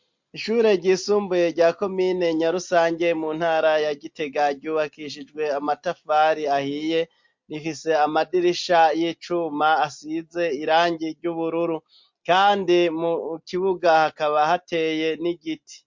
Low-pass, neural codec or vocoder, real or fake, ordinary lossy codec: 7.2 kHz; none; real; MP3, 48 kbps